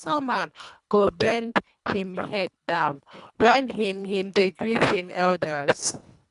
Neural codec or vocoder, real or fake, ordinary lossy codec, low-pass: codec, 24 kHz, 1.5 kbps, HILCodec; fake; none; 10.8 kHz